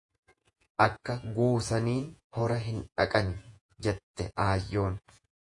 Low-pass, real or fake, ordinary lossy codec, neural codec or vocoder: 10.8 kHz; fake; AAC, 64 kbps; vocoder, 48 kHz, 128 mel bands, Vocos